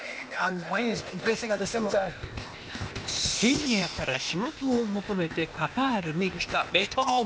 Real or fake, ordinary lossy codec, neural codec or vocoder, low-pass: fake; none; codec, 16 kHz, 0.8 kbps, ZipCodec; none